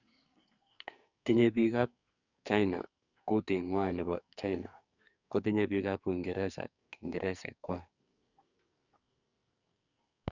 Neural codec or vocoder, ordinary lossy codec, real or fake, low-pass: codec, 44.1 kHz, 2.6 kbps, SNAC; none; fake; 7.2 kHz